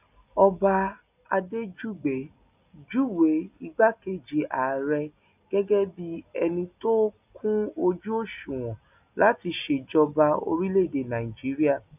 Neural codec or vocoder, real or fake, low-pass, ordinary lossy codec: none; real; 3.6 kHz; none